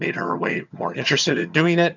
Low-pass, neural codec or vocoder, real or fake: 7.2 kHz; vocoder, 22.05 kHz, 80 mel bands, HiFi-GAN; fake